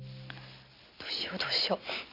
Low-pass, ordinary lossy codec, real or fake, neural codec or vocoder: 5.4 kHz; none; real; none